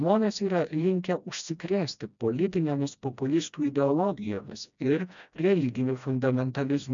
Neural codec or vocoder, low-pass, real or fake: codec, 16 kHz, 1 kbps, FreqCodec, smaller model; 7.2 kHz; fake